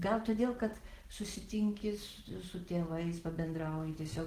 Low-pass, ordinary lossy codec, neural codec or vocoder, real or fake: 14.4 kHz; Opus, 16 kbps; none; real